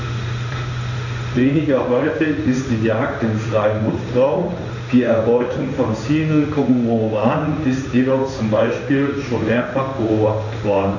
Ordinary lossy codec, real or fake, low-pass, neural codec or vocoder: none; fake; 7.2 kHz; codec, 16 kHz in and 24 kHz out, 1 kbps, XY-Tokenizer